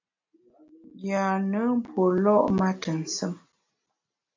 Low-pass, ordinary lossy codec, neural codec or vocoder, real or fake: 7.2 kHz; AAC, 48 kbps; none; real